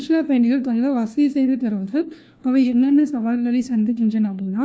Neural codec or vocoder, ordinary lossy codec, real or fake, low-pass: codec, 16 kHz, 1 kbps, FunCodec, trained on LibriTTS, 50 frames a second; none; fake; none